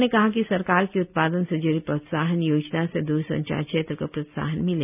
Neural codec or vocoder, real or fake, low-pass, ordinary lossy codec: none; real; 3.6 kHz; none